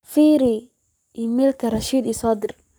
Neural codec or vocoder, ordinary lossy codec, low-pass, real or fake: codec, 44.1 kHz, 7.8 kbps, Pupu-Codec; none; none; fake